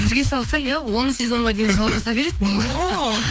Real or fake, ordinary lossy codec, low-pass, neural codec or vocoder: fake; none; none; codec, 16 kHz, 2 kbps, FreqCodec, larger model